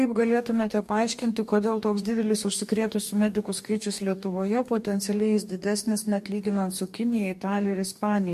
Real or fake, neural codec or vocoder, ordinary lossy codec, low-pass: fake; codec, 44.1 kHz, 2.6 kbps, DAC; MP3, 64 kbps; 14.4 kHz